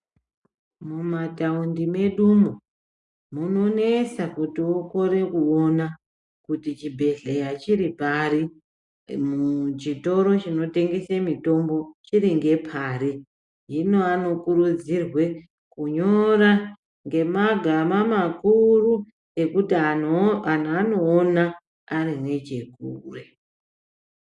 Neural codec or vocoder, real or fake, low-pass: none; real; 10.8 kHz